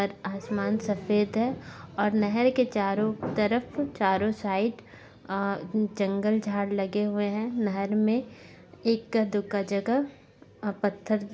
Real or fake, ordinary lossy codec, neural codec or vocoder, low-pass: real; none; none; none